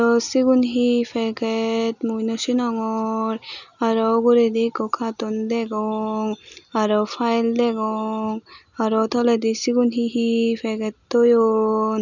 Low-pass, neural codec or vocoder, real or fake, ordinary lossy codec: 7.2 kHz; none; real; none